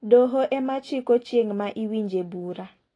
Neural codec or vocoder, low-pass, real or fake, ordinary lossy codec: none; 9.9 kHz; real; AAC, 32 kbps